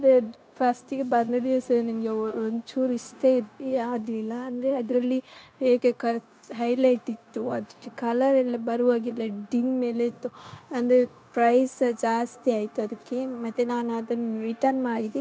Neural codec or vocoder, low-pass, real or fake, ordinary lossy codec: codec, 16 kHz, 0.9 kbps, LongCat-Audio-Codec; none; fake; none